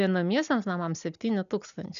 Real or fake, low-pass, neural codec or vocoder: real; 7.2 kHz; none